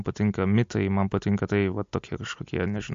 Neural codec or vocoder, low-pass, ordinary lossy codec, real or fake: none; 7.2 kHz; MP3, 48 kbps; real